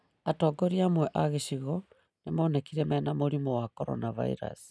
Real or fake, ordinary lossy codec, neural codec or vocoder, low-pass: real; none; none; none